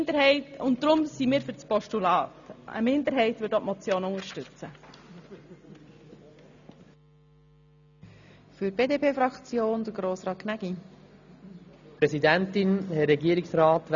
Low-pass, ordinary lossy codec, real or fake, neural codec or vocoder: 7.2 kHz; none; real; none